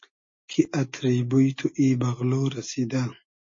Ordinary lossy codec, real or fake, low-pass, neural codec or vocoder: MP3, 32 kbps; real; 7.2 kHz; none